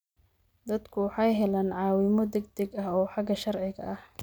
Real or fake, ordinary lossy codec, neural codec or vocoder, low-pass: real; none; none; none